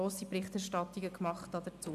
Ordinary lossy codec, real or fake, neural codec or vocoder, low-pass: MP3, 96 kbps; real; none; 14.4 kHz